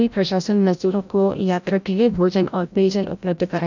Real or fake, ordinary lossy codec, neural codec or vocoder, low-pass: fake; none; codec, 16 kHz, 0.5 kbps, FreqCodec, larger model; 7.2 kHz